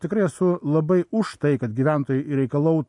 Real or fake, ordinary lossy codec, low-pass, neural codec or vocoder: real; MP3, 64 kbps; 10.8 kHz; none